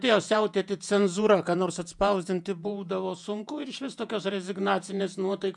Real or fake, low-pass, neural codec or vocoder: fake; 10.8 kHz; vocoder, 48 kHz, 128 mel bands, Vocos